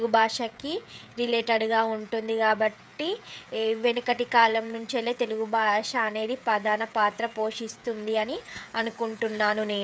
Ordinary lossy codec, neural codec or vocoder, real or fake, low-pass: none; codec, 16 kHz, 16 kbps, FreqCodec, smaller model; fake; none